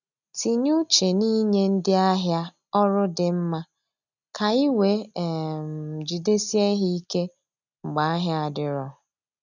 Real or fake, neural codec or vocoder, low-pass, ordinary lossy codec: real; none; 7.2 kHz; none